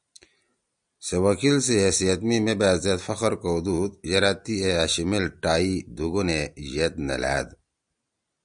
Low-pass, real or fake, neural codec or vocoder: 9.9 kHz; real; none